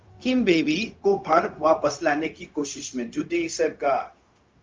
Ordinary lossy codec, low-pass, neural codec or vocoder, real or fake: Opus, 24 kbps; 7.2 kHz; codec, 16 kHz, 0.4 kbps, LongCat-Audio-Codec; fake